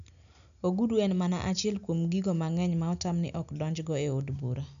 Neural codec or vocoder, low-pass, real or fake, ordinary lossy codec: none; 7.2 kHz; real; none